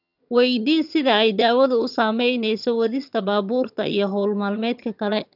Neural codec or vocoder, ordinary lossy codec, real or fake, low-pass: vocoder, 22.05 kHz, 80 mel bands, HiFi-GAN; none; fake; 5.4 kHz